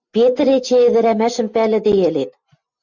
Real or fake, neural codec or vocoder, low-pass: real; none; 7.2 kHz